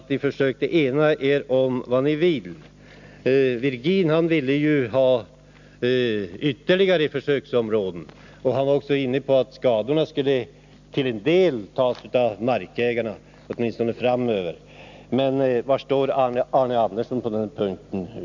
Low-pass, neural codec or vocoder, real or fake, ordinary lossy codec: 7.2 kHz; none; real; none